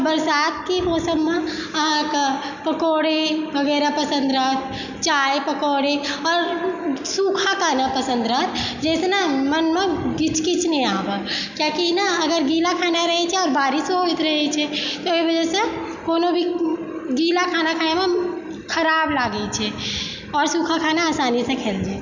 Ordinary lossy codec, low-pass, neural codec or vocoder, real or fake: none; 7.2 kHz; none; real